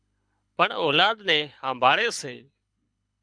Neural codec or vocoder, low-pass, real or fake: codec, 24 kHz, 6 kbps, HILCodec; 9.9 kHz; fake